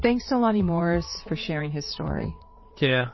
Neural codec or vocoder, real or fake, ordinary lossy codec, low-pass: vocoder, 22.05 kHz, 80 mel bands, WaveNeXt; fake; MP3, 24 kbps; 7.2 kHz